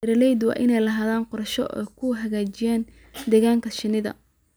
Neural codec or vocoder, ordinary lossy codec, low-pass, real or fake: none; none; none; real